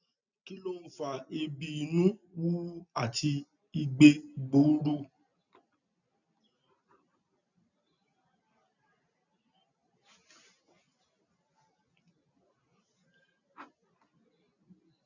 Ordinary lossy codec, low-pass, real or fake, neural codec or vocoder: none; 7.2 kHz; real; none